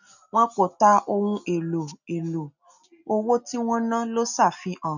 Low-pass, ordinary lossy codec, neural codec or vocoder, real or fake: 7.2 kHz; none; none; real